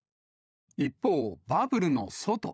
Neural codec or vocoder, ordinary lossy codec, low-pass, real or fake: codec, 16 kHz, 16 kbps, FunCodec, trained on LibriTTS, 50 frames a second; none; none; fake